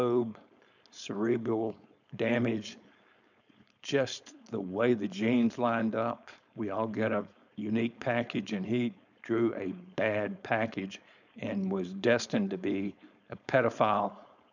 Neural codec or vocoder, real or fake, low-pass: codec, 16 kHz, 4.8 kbps, FACodec; fake; 7.2 kHz